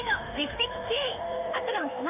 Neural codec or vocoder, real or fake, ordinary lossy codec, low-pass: autoencoder, 48 kHz, 32 numbers a frame, DAC-VAE, trained on Japanese speech; fake; none; 3.6 kHz